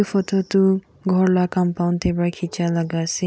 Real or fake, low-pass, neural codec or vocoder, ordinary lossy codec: real; none; none; none